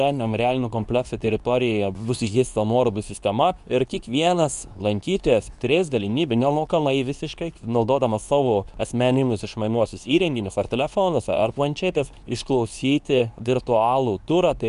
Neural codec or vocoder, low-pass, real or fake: codec, 24 kHz, 0.9 kbps, WavTokenizer, medium speech release version 2; 10.8 kHz; fake